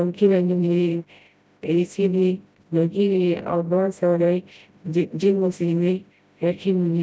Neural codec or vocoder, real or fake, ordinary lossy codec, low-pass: codec, 16 kHz, 0.5 kbps, FreqCodec, smaller model; fake; none; none